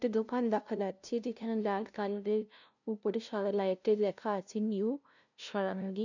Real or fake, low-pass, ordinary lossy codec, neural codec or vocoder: fake; 7.2 kHz; none; codec, 16 kHz, 0.5 kbps, FunCodec, trained on LibriTTS, 25 frames a second